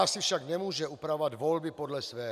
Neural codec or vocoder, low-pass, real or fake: none; 14.4 kHz; real